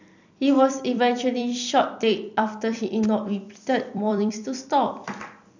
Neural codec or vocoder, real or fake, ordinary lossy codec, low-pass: vocoder, 44.1 kHz, 128 mel bands every 256 samples, BigVGAN v2; fake; none; 7.2 kHz